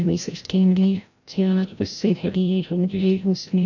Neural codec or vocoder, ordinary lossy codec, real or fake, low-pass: codec, 16 kHz, 0.5 kbps, FreqCodec, larger model; none; fake; 7.2 kHz